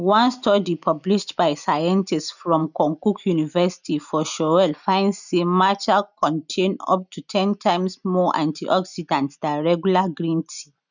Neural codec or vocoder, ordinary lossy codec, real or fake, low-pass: none; none; real; 7.2 kHz